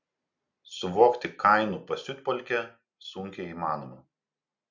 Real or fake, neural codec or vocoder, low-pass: real; none; 7.2 kHz